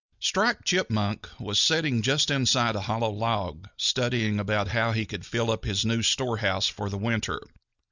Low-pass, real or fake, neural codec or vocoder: 7.2 kHz; real; none